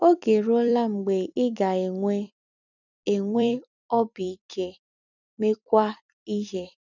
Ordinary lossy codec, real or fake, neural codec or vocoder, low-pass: none; fake; vocoder, 44.1 kHz, 128 mel bands every 256 samples, BigVGAN v2; 7.2 kHz